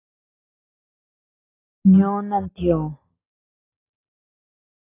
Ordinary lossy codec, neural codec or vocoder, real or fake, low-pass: AAC, 16 kbps; none; real; 3.6 kHz